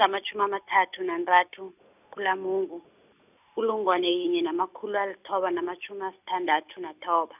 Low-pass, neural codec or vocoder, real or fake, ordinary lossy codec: 3.6 kHz; none; real; none